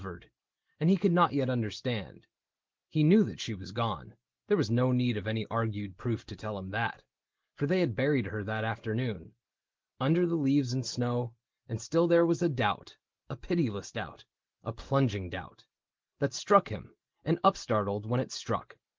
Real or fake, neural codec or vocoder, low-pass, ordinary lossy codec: real; none; 7.2 kHz; Opus, 16 kbps